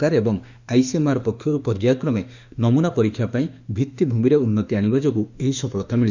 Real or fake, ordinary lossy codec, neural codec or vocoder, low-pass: fake; none; autoencoder, 48 kHz, 32 numbers a frame, DAC-VAE, trained on Japanese speech; 7.2 kHz